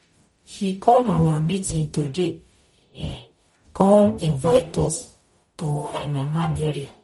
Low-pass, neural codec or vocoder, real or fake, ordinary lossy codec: 19.8 kHz; codec, 44.1 kHz, 0.9 kbps, DAC; fake; MP3, 48 kbps